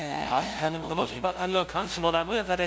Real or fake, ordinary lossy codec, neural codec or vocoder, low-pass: fake; none; codec, 16 kHz, 0.5 kbps, FunCodec, trained on LibriTTS, 25 frames a second; none